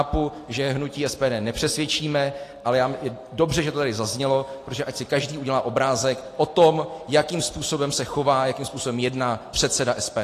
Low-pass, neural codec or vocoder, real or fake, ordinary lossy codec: 14.4 kHz; none; real; AAC, 48 kbps